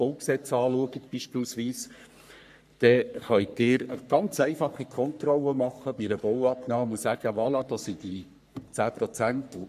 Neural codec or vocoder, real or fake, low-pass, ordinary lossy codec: codec, 44.1 kHz, 3.4 kbps, Pupu-Codec; fake; 14.4 kHz; none